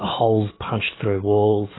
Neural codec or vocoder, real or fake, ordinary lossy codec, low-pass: codec, 44.1 kHz, 7.8 kbps, DAC; fake; AAC, 16 kbps; 7.2 kHz